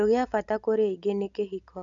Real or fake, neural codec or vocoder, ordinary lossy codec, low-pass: real; none; none; 7.2 kHz